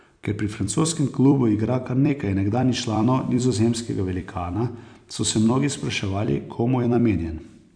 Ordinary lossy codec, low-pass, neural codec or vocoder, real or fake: none; 9.9 kHz; vocoder, 44.1 kHz, 128 mel bands every 256 samples, BigVGAN v2; fake